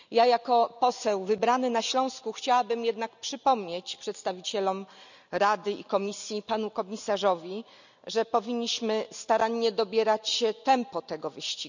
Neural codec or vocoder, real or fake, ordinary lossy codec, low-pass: none; real; none; 7.2 kHz